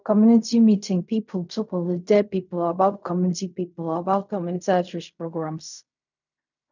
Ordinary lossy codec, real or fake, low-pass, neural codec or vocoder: none; fake; 7.2 kHz; codec, 16 kHz in and 24 kHz out, 0.4 kbps, LongCat-Audio-Codec, fine tuned four codebook decoder